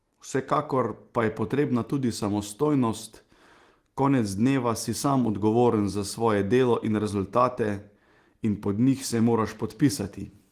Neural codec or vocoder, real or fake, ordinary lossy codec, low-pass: none; real; Opus, 24 kbps; 14.4 kHz